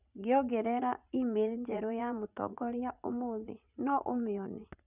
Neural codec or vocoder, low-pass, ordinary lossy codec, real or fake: vocoder, 44.1 kHz, 80 mel bands, Vocos; 3.6 kHz; none; fake